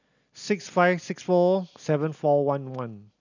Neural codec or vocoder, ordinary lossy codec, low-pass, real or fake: none; none; 7.2 kHz; real